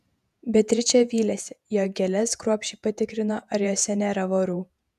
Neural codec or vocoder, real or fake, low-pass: vocoder, 48 kHz, 128 mel bands, Vocos; fake; 14.4 kHz